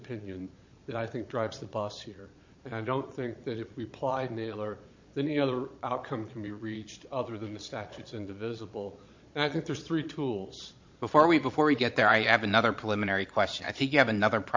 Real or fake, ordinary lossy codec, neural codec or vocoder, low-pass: fake; MP3, 48 kbps; vocoder, 22.05 kHz, 80 mel bands, Vocos; 7.2 kHz